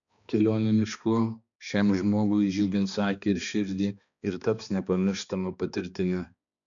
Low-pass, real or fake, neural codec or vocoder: 7.2 kHz; fake; codec, 16 kHz, 2 kbps, X-Codec, HuBERT features, trained on balanced general audio